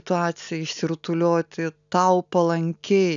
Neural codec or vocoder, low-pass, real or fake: none; 7.2 kHz; real